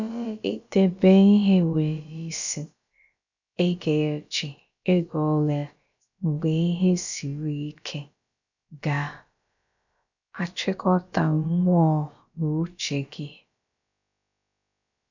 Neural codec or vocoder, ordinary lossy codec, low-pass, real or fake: codec, 16 kHz, about 1 kbps, DyCAST, with the encoder's durations; none; 7.2 kHz; fake